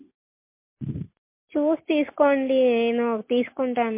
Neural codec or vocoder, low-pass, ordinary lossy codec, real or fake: none; 3.6 kHz; MP3, 24 kbps; real